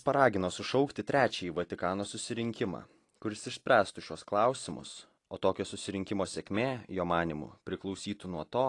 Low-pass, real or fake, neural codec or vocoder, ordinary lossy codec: 10.8 kHz; real; none; AAC, 48 kbps